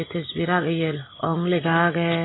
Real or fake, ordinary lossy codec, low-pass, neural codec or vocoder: real; AAC, 16 kbps; 7.2 kHz; none